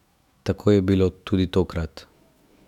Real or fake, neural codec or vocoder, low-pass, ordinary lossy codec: fake; autoencoder, 48 kHz, 128 numbers a frame, DAC-VAE, trained on Japanese speech; 19.8 kHz; none